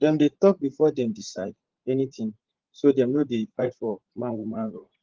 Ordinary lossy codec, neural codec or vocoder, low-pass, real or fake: Opus, 16 kbps; vocoder, 44.1 kHz, 80 mel bands, Vocos; 7.2 kHz; fake